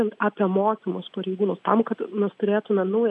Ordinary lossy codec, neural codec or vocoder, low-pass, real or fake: MP3, 96 kbps; none; 10.8 kHz; real